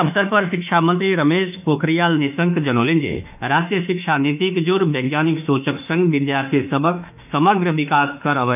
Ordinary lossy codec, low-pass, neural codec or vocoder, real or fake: none; 3.6 kHz; autoencoder, 48 kHz, 32 numbers a frame, DAC-VAE, trained on Japanese speech; fake